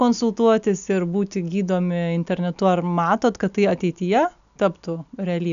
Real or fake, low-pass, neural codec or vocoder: real; 7.2 kHz; none